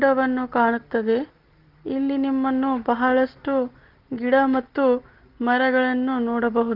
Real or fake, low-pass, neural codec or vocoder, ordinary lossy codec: real; 5.4 kHz; none; Opus, 16 kbps